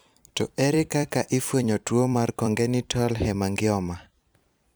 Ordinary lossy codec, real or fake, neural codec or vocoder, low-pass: none; fake; vocoder, 44.1 kHz, 128 mel bands every 256 samples, BigVGAN v2; none